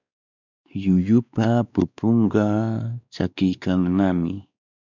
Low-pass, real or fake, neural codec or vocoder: 7.2 kHz; fake; codec, 16 kHz, 2 kbps, X-Codec, WavLM features, trained on Multilingual LibriSpeech